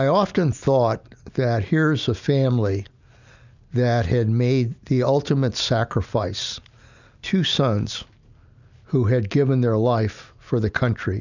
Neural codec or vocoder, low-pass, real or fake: none; 7.2 kHz; real